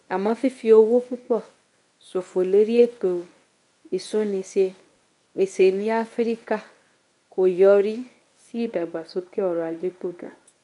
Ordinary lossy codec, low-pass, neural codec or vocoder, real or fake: none; 10.8 kHz; codec, 24 kHz, 0.9 kbps, WavTokenizer, medium speech release version 1; fake